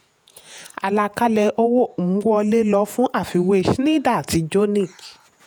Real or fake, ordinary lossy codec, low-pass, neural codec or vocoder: fake; none; none; vocoder, 48 kHz, 128 mel bands, Vocos